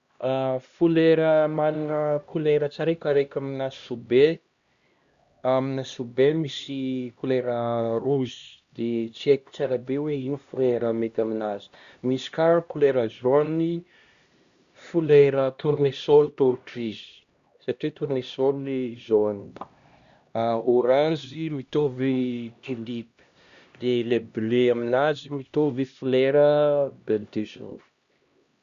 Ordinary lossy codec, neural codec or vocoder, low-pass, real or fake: Opus, 64 kbps; codec, 16 kHz, 1 kbps, X-Codec, HuBERT features, trained on LibriSpeech; 7.2 kHz; fake